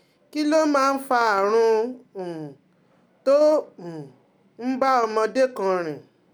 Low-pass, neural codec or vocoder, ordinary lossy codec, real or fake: none; none; none; real